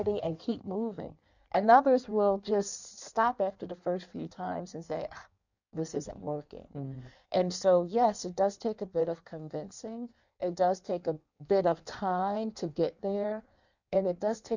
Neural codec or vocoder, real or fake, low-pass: codec, 16 kHz in and 24 kHz out, 1.1 kbps, FireRedTTS-2 codec; fake; 7.2 kHz